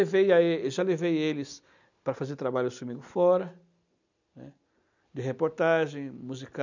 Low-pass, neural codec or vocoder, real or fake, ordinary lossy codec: 7.2 kHz; none; real; none